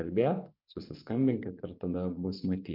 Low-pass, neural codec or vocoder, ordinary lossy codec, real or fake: 5.4 kHz; vocoder, 24 kHz, 100 mel bands, Vocos; MP3, 48 kbps; fake